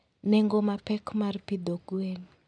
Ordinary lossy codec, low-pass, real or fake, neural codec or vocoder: none; 9.9 kHz; real; none